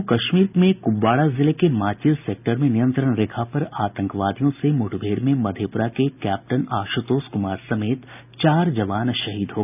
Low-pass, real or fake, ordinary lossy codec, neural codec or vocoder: 3.6 kHz; real; none; none